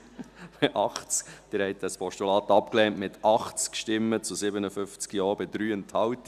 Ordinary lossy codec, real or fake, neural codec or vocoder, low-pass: none; real; none; 14.4 kHz